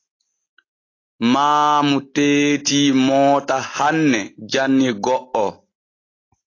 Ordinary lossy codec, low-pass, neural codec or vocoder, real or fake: AAC, 32 kbps; 7.2 kHz; none; real